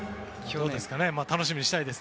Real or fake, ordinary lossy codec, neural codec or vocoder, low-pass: real; none; none; none